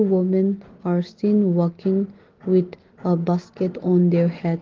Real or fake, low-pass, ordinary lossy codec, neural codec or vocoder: real; 7.2 kHz; Opus, 16 kbps; none